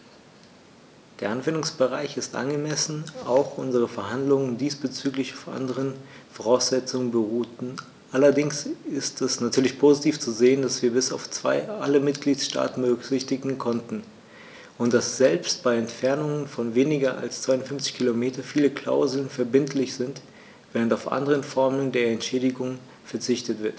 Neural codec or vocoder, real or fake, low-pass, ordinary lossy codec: none; real; none; none